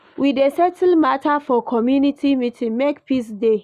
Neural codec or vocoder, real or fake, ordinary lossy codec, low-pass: none; real; none; 14.4 kHz